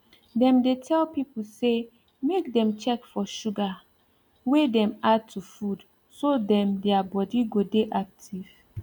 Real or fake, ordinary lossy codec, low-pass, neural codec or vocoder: real; none; 19.8 kHz; none